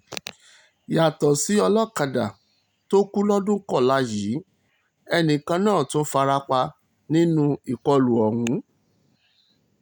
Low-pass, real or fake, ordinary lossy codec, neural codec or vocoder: 19.8 kHz; real; none; none